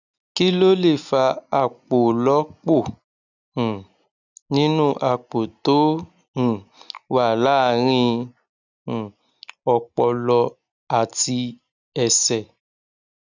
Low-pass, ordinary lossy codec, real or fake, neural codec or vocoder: 7.2 kHz; none; real; none